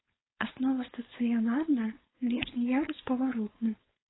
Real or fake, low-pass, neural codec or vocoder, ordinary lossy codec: fake; 7.2 kHz; codec, 16 kHz, 4.8 kbps, FACodec; AAC, 16 kbps